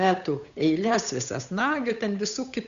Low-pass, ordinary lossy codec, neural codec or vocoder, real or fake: 7.2 kHz; AAC, 96 kbps; codec, 16 kHz, 8 kbps, FunCodec, trained on Chinese and English, 25 frames a second; fake